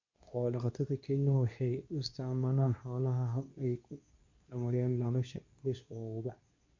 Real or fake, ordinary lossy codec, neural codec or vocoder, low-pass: fake; MP3, 48 kbps; codec, 24 kHz, 0.9 kbps, WavTokenizer, medium speech release version 2; 7.2 kHz